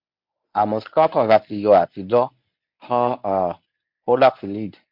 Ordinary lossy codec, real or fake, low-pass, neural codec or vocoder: MP3, 48 kbps; fake; 5.4 kHz; codec, 24 kHz, 0.9 kbps, WavTokenizer, medium speech release version 1